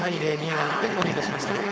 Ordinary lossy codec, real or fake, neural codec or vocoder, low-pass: none; fake; codec, 16 kHz, 4.8 kbps, FACodec; none